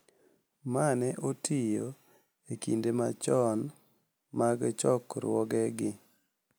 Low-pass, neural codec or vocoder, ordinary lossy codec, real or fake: none; none; none; real